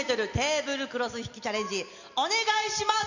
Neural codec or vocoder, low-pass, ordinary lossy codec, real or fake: none; 7.2 kHz; none; real